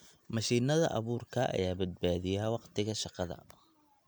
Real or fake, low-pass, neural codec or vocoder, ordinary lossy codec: real; none; none; none